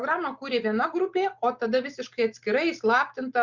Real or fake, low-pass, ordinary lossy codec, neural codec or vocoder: real; 7.2 kHz; Opus, 64 kbps; none